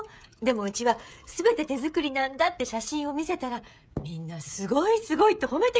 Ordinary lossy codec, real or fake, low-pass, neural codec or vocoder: none; fake; none; codec, 16 kHz, 16 kbps, FreqCodec, smaller model